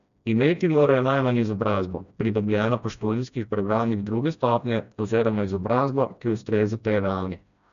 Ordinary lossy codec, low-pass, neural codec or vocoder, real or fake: none; 7.2 kHz; codec, 16 kHz, 1 kbps, FreqCodec, smaller model; fake